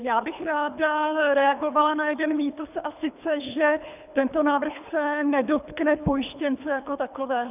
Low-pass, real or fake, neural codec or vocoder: 3.6 kHz; fake; codec, 24 kHz, 3 kbps, HILCodec